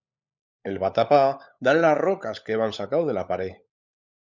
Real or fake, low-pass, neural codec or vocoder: fake; 7.2 kHz; codec, 16 kHz, 16 kbps, FunCodec, trained on LibriTTS, 50 frames a second